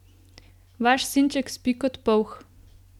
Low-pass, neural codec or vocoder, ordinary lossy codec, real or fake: 19.8 kHz; none; none; real